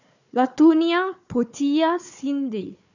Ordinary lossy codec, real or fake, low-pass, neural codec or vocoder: none; fake; 7.2 kHz; codec, 16 kHz, 4 kbps, FunCodec, trained on Chinese and English, 50 frames a second